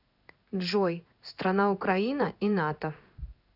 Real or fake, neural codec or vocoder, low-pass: fake; codec, 16 kHz in and 24 kHz out, 1 kbps, XY-Tokenizer; 5.4 kHz